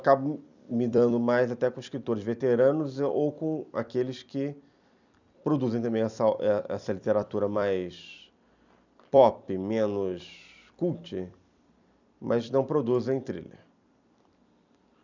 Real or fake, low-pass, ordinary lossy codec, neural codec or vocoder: fake; 7.2 kHz; none; vocoder, 44.1 kHz, 128 mel bands every 256 samples, BigVGAN v2